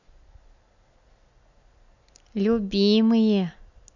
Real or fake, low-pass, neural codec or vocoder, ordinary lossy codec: real; 7.2 kHz; none; none